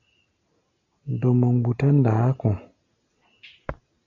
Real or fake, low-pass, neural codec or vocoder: real; 7.2 kHz; none